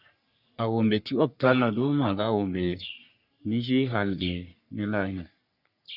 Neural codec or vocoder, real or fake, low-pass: codec, 44.1 kHz, 3.4 kbps, Pupu-Codec; fake; 5.4 kHz